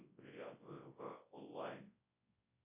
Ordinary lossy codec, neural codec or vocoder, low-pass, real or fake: MP3, 24 kbps; codec, 24 kHz, 0.9 kbps, WavTokenizer, large speech release; 3.6 kHz; fake